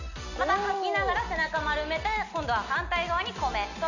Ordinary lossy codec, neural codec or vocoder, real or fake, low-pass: none; none; real; 7.2 kHz